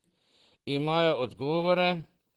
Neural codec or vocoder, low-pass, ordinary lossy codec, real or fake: vocoder, 44.1 kHz, 128 mel bands, Pupu-Vocoder; 19.8 kHz; Opus, 16 kbps; fake